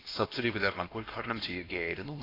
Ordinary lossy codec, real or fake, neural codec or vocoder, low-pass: AAC, 24 kbps; fake; codec, 16 kHz, 1 kbps, X-Codec, HuBERT features, trained on LibriSpeech; 5.4 kHz